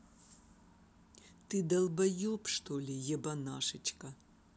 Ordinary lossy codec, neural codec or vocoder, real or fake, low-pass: none; none; real; none